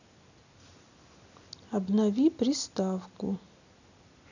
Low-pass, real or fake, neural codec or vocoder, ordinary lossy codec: 7.2 kHz; real; none; none